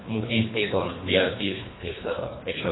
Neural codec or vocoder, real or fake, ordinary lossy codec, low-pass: codec, 24 kHz, 1.5 kbps, HILCodec; fake; AAC, 16 kbps; 7.2 kHz